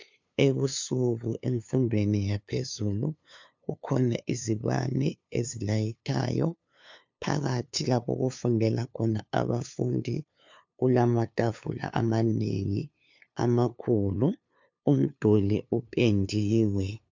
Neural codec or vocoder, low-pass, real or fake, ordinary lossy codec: codec, 16 kHz, 2 kbps, FunCodec, trained on LibriTTS, 25 frames a second; 7.2 kHz; fake; MP3, 64 kbps